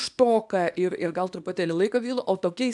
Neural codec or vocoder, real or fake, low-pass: codec, 24 kHz, 0.9 kbps, WavTokenizer, small release; fake; 10.8 kHz